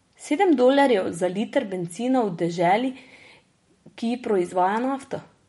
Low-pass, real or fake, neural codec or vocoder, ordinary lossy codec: 19.8 kHz; real; none; MP3, 48 kbps